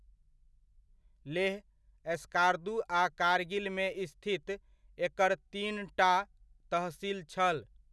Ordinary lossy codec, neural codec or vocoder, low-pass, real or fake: none; none; none; real